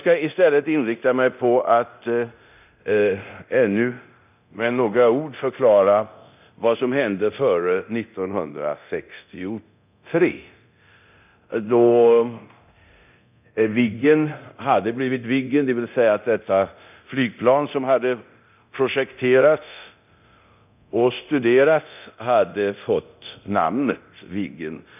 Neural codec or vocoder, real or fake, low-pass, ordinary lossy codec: codec, 24 kHz, 0.9 kbps, DualCodec; fake; 3.6 kHz; none